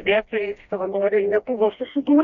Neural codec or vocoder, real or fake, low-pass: codec, 16 kHz, 1 kbps, FreqCodec, smaller model; fake; 7.2 kHz